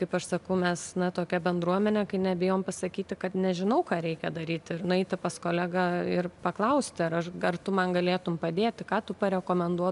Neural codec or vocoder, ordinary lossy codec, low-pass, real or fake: none; MP3, 96 kbps; 10.8 kHz; real